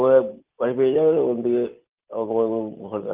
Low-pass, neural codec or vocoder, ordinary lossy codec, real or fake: 3.6 kHz; none; Opus, 24 kbps; real